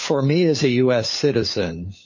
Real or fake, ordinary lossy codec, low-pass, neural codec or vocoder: fake; MP3, 32 kbps; 7.2 kHz; codec, 16 kHz, 4 kbps, FunCodec, trained on LibriTTS, 50 frames a second